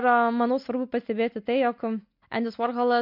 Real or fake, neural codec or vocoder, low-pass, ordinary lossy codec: real; none; 5.4 kHz; MP3, 48 kbps